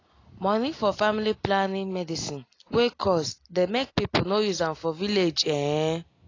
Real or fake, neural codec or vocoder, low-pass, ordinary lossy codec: real; none; 7.2 kHz; AAC, 32 kbps